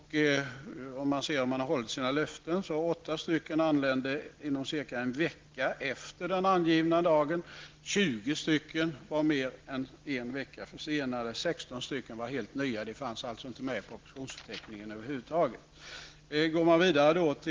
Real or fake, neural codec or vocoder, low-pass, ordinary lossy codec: real; none; 7.2 kHz; Opus, 16 kbps